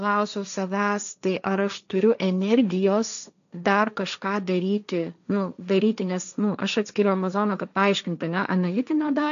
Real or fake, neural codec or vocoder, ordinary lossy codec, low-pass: fake; codec, 16 kHz, 1.1 kbps, Voila-Tokenizer; MP3, 96 kbps; 7.2 kHz